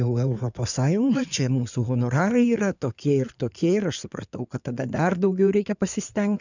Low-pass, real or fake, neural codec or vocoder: 7.2 kHz; fake; codec, 16 kHz in and 24 kHz out, 2.2 kbps, FireRedTTS-2 codec